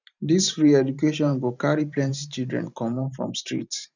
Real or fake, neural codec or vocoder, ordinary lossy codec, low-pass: fake; vocoder, 44.1 kHz, 128 mel bands every 512 samples, BigVGAN v2; none; 7.2 kHz